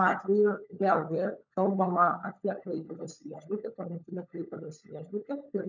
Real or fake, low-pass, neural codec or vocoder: fake; 7.2 kHz; codec, 16 kHz, 16 kbps, FunCodec, trained on LibriTTS, 50 frames a second